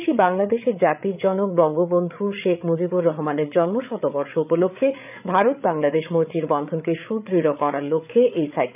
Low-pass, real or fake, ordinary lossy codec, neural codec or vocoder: 3.6 kHz; fake; none; codec, 16 kHz, 8 kbps, FreqCodec, larger model